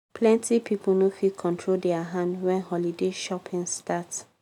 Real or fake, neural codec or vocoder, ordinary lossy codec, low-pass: real; none; none; 19.8 kHz